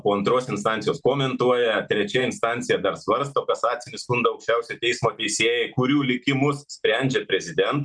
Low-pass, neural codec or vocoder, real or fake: 9.9 kHz; none; real